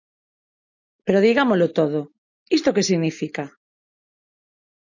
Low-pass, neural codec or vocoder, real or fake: 7.2 kHz; none; real